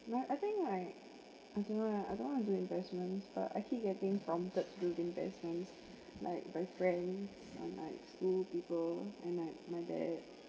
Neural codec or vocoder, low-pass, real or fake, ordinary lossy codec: none; none; real; none